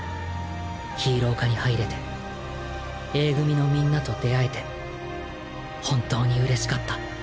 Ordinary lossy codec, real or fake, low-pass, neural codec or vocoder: none; real; none; none